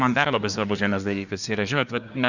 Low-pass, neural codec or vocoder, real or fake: 7.2 kHz; codec, 24 kHz, 1 kbps, SNAC; fake